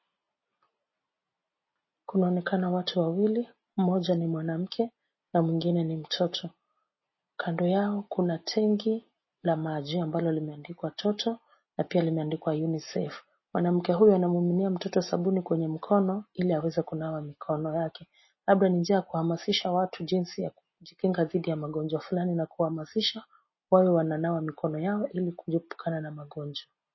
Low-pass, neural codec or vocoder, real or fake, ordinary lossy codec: 7.2 kHz; none; real; MP3, 24 kbps